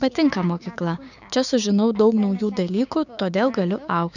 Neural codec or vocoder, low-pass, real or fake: codec, 16 kHz, 6 kbps, DAC; 7.2 kHz; fake